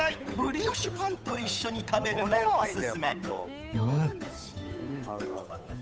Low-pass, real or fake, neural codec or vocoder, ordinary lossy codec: none; fake; codec, 16 kHz, 8 kbps, FunCodec, trained on Chinese and English, 25 frames a second; none